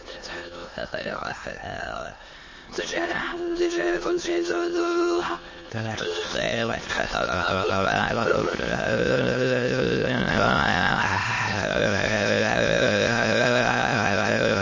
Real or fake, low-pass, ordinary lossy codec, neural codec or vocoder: fake; 7.2 kHz; MP3, 32 kbps; autoencoder, 22.05 kHz, a latent of 192 numbers a frame, VITS, trained on many speakers